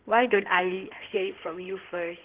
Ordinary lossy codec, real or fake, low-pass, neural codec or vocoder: Opus, 16 kbps; fake; 3.6 kHz; codec, 16 kHz in and 24 kHz out, 2.2 kbps, FireRedTTS-2 codec